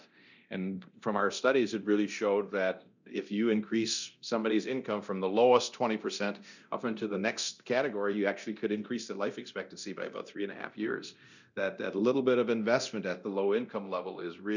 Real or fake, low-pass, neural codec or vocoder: fake; 7.2 kHz; codec, 24 kHz, 0.9 kbps, DualCodec